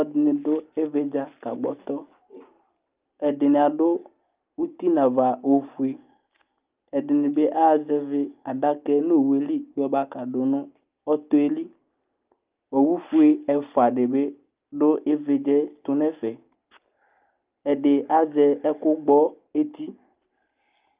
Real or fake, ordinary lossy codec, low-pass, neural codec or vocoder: real; Opus, 24 kbps; 3.6 kHz; none